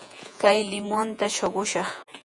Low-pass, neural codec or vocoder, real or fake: 10.8 kHz; vocoder, 48 kHz, 128 mel bands, Vocos; fake